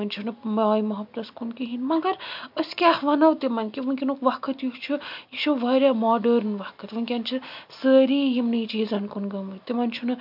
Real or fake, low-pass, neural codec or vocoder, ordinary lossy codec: real; 5.4 kHz; none; MP3, 48 kbps